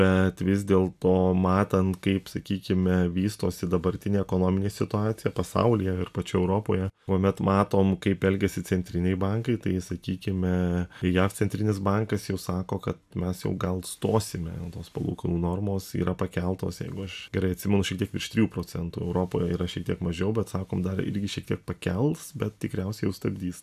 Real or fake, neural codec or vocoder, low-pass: real; none; 14.4 kHz